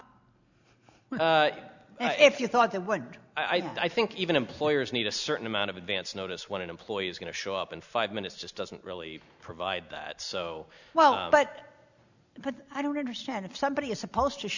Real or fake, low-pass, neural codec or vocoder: real; 7.2 kHz; none